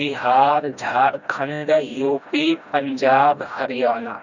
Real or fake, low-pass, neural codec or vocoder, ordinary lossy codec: fake; 7.2 kHz; codec, 16 kHz, 1 kbps, FreqCodec, smaller model; none